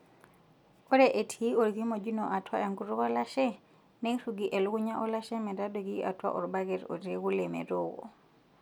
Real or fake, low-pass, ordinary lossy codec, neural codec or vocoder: real; 19.8 kHz; none; none